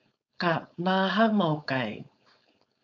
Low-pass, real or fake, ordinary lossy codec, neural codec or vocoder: 7.2 kHz; fake; MP3, 64 kbps; codec, 16 kHz, 4.8 kbps, FACodec